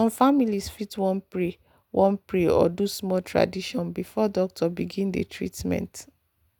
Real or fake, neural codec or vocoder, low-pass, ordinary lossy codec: real; none; 19.8 kHz; none